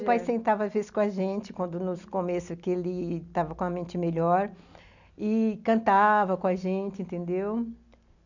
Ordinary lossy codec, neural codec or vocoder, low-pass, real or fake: none; none; 7.2 kHz; real